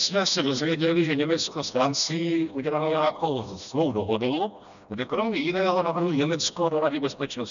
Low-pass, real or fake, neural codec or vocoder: 7.2 kHz; fake; codec, 16 kHz, 1 kbps, FreqCodec, smaller model